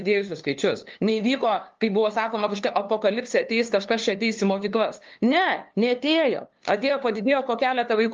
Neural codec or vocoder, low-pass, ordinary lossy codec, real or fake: codec, 16 kHz, 2 kbps, FunCodec, trained on LibriTTS, 25 frames a second; 7.2 kHz; Opus, 32 kbps; fake